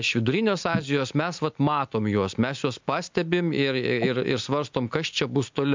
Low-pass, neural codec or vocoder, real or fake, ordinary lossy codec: 7.2 kHz; none; real; MP3, 64 kbps